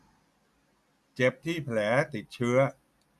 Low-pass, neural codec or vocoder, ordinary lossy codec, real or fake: 14.4 kHz; none; none; real